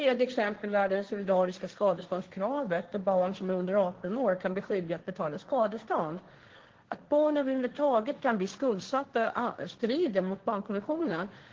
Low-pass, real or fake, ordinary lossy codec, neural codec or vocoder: 7.2 kHz; fake; Opus, 16 kbps; codec, 16 kHz, 1.1 kbps, Voila-Tokenizer